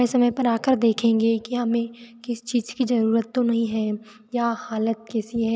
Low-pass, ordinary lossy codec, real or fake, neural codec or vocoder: none; none; real; none